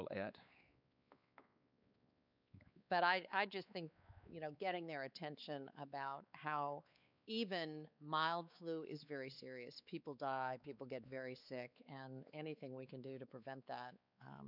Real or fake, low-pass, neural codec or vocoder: fake; 5.4 kHz; codec, 16 kHz, 4 kbps, X-Codec, WavLM features, trained on Multilingual LibriSpeech